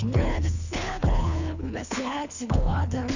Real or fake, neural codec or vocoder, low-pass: fake; codec, 24 kHz, 3 kbps, HILCodec; 7.2 kHz